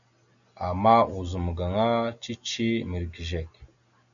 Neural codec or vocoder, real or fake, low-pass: none; real; 7.2 kHz